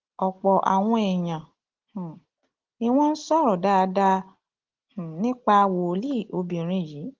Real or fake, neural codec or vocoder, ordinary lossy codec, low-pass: real; none; Opus, 16 kbps; 7.2 kHz